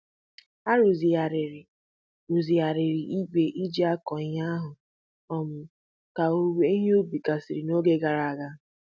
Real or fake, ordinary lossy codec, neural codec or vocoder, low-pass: real; none; none; none